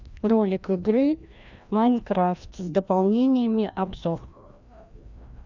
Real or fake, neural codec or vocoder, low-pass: fake; codec, 16 kHz, 1 kbps, FreqCodec, larger model; 7.2 kHz